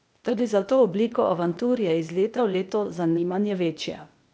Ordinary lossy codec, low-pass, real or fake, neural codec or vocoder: none; none; fake; codec, 16 kHz, 0.8 kbps, ZipCodec